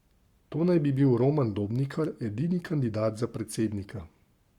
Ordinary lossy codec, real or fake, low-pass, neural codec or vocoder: Opus, 64 kbps; fake; 19.8 kHz; codec, 44.1 kHz, 7.8 kbps, Pupu-Codec